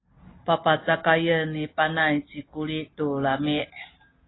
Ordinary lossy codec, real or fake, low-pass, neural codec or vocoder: AAC, 16 kbps; real; 7.2 kHz; none